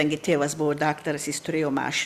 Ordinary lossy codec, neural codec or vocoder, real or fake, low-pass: Opus, 64 kbps; none; real; 14.4 kHz